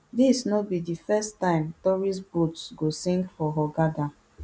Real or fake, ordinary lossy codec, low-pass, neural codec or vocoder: real; none; none; none